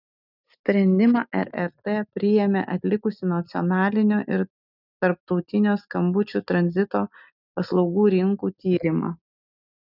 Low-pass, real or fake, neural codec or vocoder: 5.4 kHz; real; none